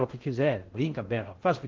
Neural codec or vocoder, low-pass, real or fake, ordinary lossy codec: codec, 16 kHz in and 24 kHz out, 0.6 kbps, FocalCodec, streaming, 2048 codes; 7.2 kHz; fake; Opus, 16 kbps